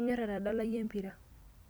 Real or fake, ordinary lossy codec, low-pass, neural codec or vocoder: fake; none; none; vocoder, 44.1 kHz, 128 mel bands every 256 samples, BigVGAN v2